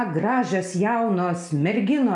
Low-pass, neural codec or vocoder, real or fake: 10.8 kHz; none; real